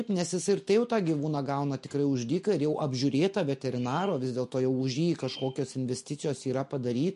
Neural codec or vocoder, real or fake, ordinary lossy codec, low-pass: none; real; MP3, 48 kbps; 14.4 kHz